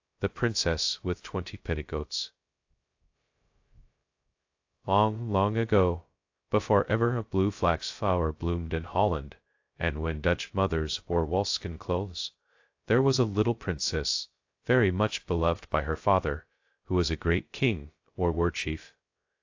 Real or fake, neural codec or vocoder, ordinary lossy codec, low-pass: fake; codec, 16 kHz, 0.2 kbps, FocalCodec; AAC, 48 kbps; 7.2 kHz